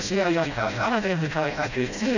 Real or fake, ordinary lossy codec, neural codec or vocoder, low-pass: fake; none; codec, 16 kHz, 0.5 kbps, FreqCodec, smaller model; 7.2 kHz